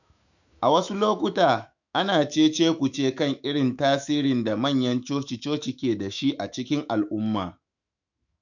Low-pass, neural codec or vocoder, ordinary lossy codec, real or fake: 7.2 kHz; autoencoder, 48 kHz, 128 numbers a frame, DAC-VAE, trained on Japanese speech; none; fake